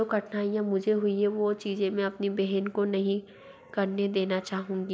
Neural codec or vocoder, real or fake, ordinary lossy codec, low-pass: none; real; none; none